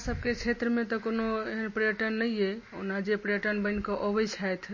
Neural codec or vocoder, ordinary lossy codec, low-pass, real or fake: none; MP3, 32 kbps; 7.2 kHz; real